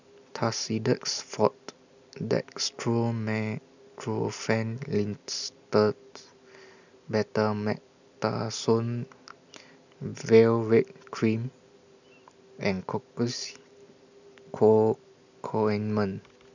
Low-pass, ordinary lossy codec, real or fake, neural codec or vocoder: 7.2 kHz; none; real; none